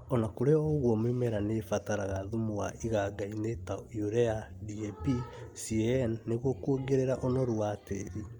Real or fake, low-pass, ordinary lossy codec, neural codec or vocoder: real; 19.8 kHz; Opus, 32 kbps; none